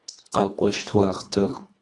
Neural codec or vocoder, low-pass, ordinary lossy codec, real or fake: codec, 24 kHz, 1.5 kbps, HILCodec; 10.8 kHz; Opus, 64 kbps; fake